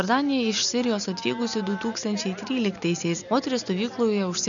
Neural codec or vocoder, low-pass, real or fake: none; 7.2 kHz; real